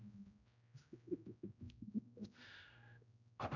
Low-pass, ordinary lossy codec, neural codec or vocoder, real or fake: 7.2 kHz; none; codec, 16 kHz, 0.5 kbps, X-Codec, HuBERT features, trained on general audio; fake